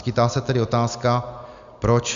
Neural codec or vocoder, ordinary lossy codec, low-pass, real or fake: none; Opus, 64 kbps; 7.2 kHz; real